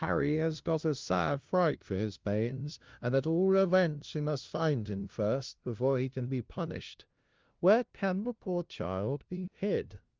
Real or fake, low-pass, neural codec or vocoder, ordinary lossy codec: fake; 7.2 kHz; codec, 16 kHz, 0.5 kbps, FunCodec, trained on LibriTTS, 25 frames a second; Opus, 32 kbps